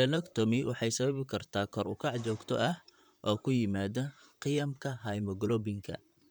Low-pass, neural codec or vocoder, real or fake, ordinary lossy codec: none; vocoder, 44.1 kHz, 128 mel bands, Pupu-Vocoder; fake; none